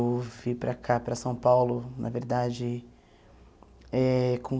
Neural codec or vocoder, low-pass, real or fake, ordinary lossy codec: none; none; real; none